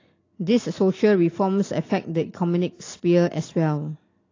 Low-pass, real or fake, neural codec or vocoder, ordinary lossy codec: 7.2 kHz; real; none; AAC, 32 kbps